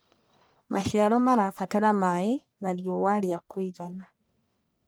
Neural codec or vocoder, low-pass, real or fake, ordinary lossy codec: codec, 44.1 kHz, 1.7 kbps, Pupu-Codec; none; fake; none